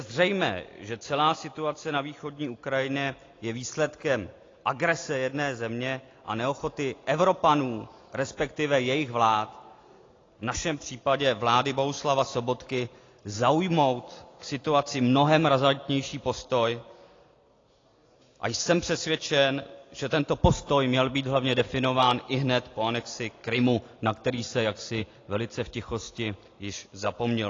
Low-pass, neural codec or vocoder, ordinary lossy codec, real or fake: 7.2 kHz; none; AAC, 32 kbps; real